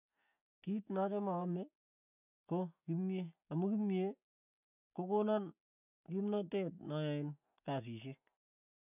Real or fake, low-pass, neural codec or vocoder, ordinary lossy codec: real; 3.6 kHz; none; none